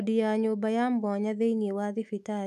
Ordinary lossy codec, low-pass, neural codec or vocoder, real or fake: none; 14.4 kHz; autoencoder, 48 kHz, 128 numbers a frame, DAC-VAE, trained on Japanese speech; fake